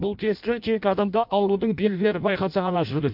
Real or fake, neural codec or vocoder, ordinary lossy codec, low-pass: fake; codec, 16 kHz in and 24 kHz out, 0.6 kbps, FireRedTTS-2 codec; MP3, 48 kbps; 5.4 kHz